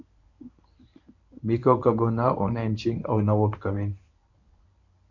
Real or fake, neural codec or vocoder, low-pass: fake; codec, 24 kHz, 0.9 kbps, WavTokenizer, medium speech release version 1; 7.2 kHz